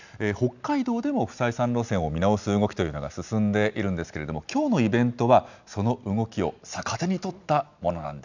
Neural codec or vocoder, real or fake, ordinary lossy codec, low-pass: none; real; none; 7.2 kHz